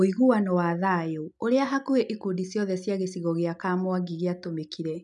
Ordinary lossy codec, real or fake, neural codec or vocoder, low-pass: none; real; none; 10.8 kHz